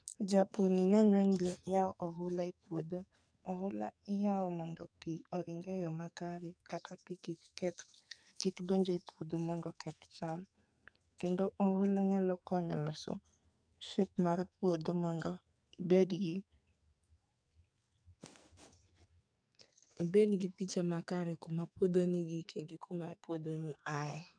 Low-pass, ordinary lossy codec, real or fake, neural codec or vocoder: 9.9 kHz; none; fake; codec, 44.1 kHz, 2.6 kbps, SNAC